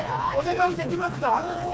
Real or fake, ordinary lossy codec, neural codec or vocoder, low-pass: fake; none; codec, 16 kHz, 2 kbps, FreqCodec, smaller model; none